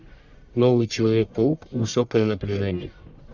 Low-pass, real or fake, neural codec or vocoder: 7.2 kHz; fake; codec, 44.1 kHz, 1.7 kbps, Pupu-Codec